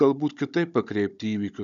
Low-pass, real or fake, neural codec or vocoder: 7.2 kHz; fake; codec, 16 kHz, 16 kbps, FunCodec, trained on Chinese and English, 50 frames a second